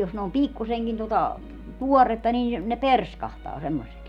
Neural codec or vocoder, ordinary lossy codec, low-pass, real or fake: none; none; 19.8 kHz; real